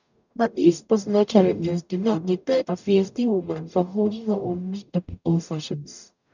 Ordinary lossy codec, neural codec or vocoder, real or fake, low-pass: none; codec, 44.1 kHz, 0.9 kbps, DAC; fake; 7.2 kHz